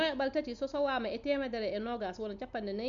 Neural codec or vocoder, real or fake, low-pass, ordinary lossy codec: none; real; 7.2 kHz; Opus, 64 kbps